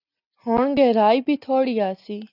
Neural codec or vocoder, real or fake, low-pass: none; real; 5.4 kHz